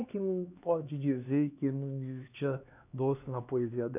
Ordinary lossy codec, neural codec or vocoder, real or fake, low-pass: none; codec, 16 kHz, 2 kbps, X-Codec, HuBERT features, trained on LibriSpeech; fake; 3.6 kHz